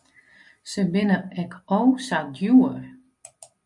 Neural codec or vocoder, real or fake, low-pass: none; real; 10.8 kHz